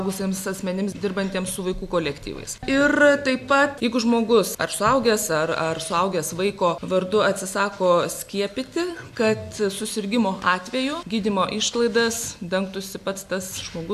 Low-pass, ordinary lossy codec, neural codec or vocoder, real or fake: 14.4 kHz; AAC, 96 kbps; none; real